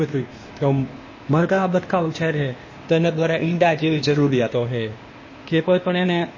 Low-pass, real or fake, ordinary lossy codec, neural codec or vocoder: 7.2 kHz; fake; MP3, 32 kbps; codec, 16 kHz, 0.8 kbps, ZipCodec